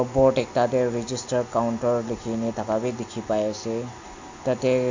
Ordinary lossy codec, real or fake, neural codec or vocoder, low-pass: AAC, 48 kbps; real; none; 7.2 kHz